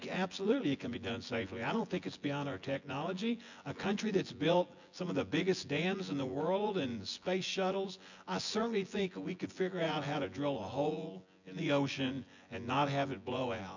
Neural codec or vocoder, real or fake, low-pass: vocoder, 24 kHz, 100 mel bands, Vocos; fake; 7.2 kHz